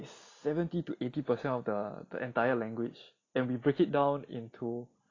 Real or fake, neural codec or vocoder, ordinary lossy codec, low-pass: real; none; AAC, 32 kbps; 7.2 kHz